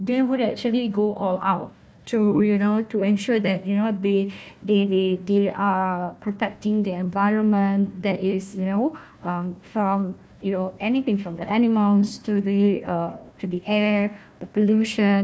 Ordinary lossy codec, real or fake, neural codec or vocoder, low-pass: none; fake; codec, 16 kHz, 1 kbps, FunCodec, trained on Chinese and English, 50 frames a second; none